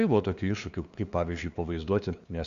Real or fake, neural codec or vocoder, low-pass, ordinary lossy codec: fake; codec, 16 kHz, 2 kbps, FunCodec, trained on LibriTTS, 25 frames a second; 7.2 kHz; Opus, 64 kbps